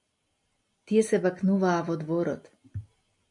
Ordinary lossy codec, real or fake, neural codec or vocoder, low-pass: MP3, 48 kbps; real; none; 10.8 kHz